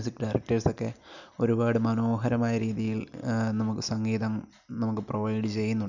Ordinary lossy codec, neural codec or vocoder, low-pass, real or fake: none; none; 7.2 kHz; real